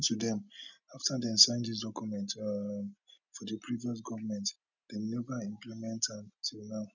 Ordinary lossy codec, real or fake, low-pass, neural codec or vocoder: none; real; 7.2 kHz; none